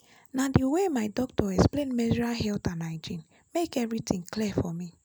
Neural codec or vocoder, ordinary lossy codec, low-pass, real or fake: none; none; none; real